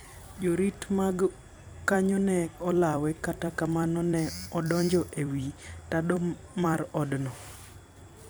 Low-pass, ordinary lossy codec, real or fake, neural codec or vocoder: none; none; fake; vocoder, 44.1 kHz, 128 mel bands every 256 samples, BigVGAN v2